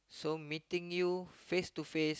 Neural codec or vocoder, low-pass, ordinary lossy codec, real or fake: none; none; none; real